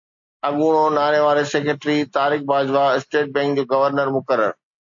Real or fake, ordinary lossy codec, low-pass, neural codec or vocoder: real; MP3, 48 kbps; 7.2 kHz; none